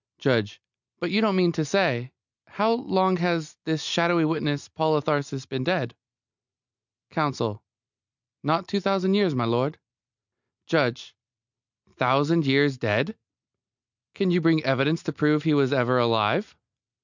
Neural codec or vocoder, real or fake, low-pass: none; real; 7.2 kHz